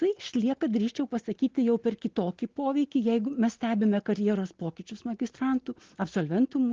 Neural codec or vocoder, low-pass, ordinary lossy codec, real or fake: none; 7.2 kHz; Opus, 16 kbps; real